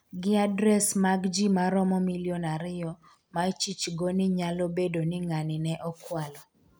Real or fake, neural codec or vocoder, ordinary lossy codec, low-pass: real; none; none; none